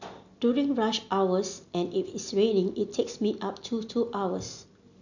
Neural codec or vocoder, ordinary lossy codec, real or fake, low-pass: none; none; real; 7.2 kHz